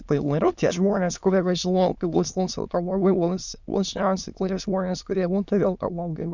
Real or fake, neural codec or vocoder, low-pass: fake; autoencoder, 22.05 kHz, a latent of 192 numbers a frame, VITS, trained on many speakers; 7.2 kHz